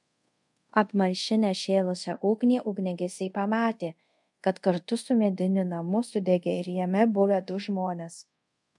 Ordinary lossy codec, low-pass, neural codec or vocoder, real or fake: MP3, 64 kbps; 10.8 kHz; codec, 24 kHz, 0.5 kbps, DualCodec; fake